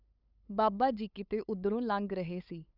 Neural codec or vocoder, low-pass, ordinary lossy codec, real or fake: codec, 16 kHz, 8 kbps, FunCodec, trained on LibriTTS, 25 frames a second; 5.4 kHz; none; fake